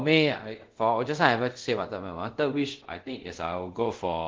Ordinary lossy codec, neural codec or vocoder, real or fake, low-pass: Opus, 16 kbps; codec, 24 kHz, 0.5 kbps, DualCodec; fake; 7.2 kHz